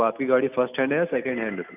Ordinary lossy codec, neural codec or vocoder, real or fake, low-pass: none; none; real; 3.6 kHz